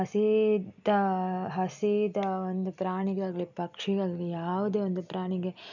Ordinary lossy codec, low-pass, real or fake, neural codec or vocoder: none; 7.2 kHz; real; none